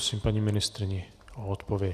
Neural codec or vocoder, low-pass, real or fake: none; 14.4 kHz; real